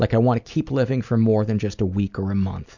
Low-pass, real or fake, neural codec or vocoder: 7.2 kHz; real; none